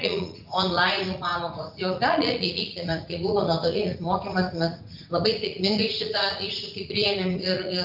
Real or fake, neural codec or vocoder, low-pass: fake; vocoder, 44.1 kHz, 80 mel bands, Vocos; 5.4 kHz